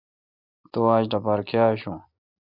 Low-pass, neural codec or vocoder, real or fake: 5.4 kHz; none; real